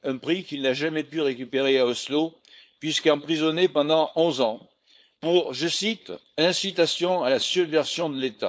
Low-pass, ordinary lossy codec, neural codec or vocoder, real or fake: none; none; codec, 16 kHz, 4.8 kbps, FACodec; fake